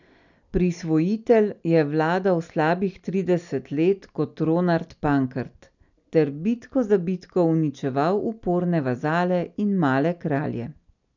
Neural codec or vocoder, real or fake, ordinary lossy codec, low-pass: none; real; none; 7.2 kHz